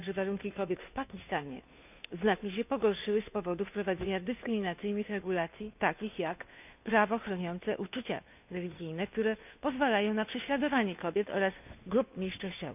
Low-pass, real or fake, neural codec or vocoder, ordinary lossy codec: 3.6 kHz; fake; codec, 16 kHz, 2 kbps, FunCodec, trained on Chinese and English, 25 frames a second; none